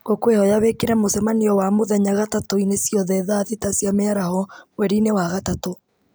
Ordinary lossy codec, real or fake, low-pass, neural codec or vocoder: none; real; none; none